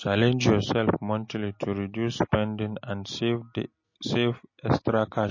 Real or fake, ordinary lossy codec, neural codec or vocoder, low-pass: real; MP3, 32 kbps; none; 7.2 kHz